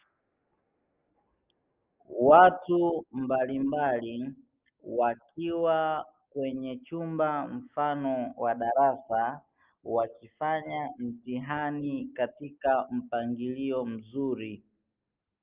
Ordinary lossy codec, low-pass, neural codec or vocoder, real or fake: Opus, 24 kbps; 3.6 kHz; none; real